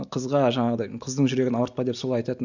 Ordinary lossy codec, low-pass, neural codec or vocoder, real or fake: none; 7.2 kHz; none; real